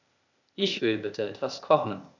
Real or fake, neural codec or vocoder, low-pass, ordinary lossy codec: fake; codec, 16 kHz, 0.8 kbps, ZipCodec; 7.2 kHz; none